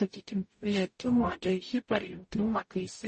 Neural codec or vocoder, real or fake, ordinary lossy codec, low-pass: codec, 44.1 kHz, 0.9 kbps, DAC; fake; MP3, 32 kbps; 10.8 kHz